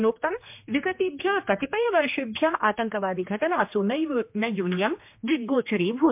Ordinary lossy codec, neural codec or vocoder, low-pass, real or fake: MP3, 32 kbps; codec, 16 kHz, 2 kbps, X-Codec, HuBERT features, trained on general audio; 3.6 kHz; fake